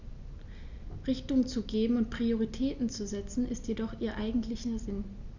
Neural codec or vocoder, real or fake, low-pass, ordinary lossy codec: none; real; 7.2 kHz; none